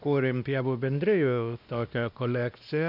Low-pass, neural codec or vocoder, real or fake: 5.4 kHz; codec, 16 kHz, 1 kbps, X-Codec, WavLM features, trained on Multilingual LibriSpeech; fake